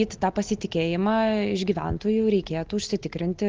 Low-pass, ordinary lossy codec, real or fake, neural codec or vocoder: 7.2 kHz; Opus, 16 kbps; real; none